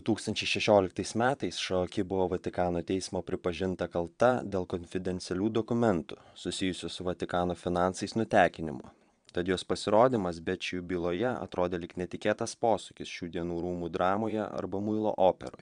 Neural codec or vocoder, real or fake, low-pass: vocoder, 22.05 kHz, 80 mel bands, Vocos; fake; 9.9 kHz